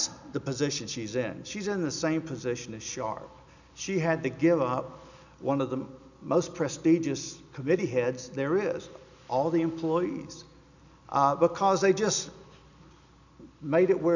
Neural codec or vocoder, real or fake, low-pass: none; real; 7.2 kHz